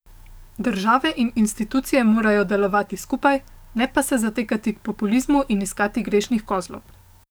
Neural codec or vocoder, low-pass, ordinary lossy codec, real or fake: codec, 44.1 kHz, 7.8 kbps, DAC; none; none; fake